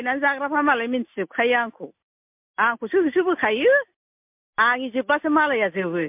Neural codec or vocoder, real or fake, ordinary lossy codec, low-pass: none; real; MP3, 32 kbps; 3.6 kHz